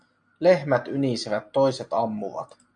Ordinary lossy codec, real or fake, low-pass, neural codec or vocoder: AAC, 64 kbps; real; 9.9 kHz; none